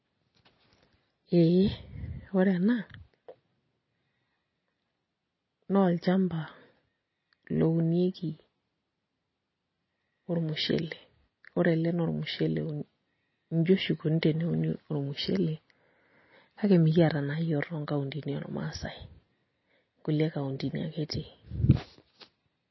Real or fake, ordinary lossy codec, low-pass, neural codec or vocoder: real; MP3, 24 kbps; 7.2 kHz; none